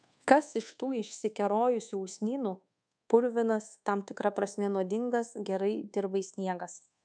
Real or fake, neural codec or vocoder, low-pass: fake; codec, 24 kHz, 1.2 kbps, DualCodec; 9.9 kHz